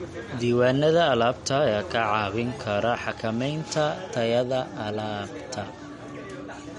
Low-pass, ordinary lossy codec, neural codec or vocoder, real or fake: 19.8 kHz; MP3, 48 kbps; none; real